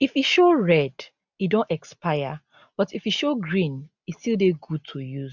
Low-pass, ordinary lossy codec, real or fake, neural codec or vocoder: 7.2 kHz; none; real; none